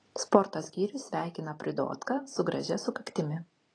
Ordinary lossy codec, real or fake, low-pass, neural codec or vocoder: AAC, 32 kbps; real; 9.9 kHz; none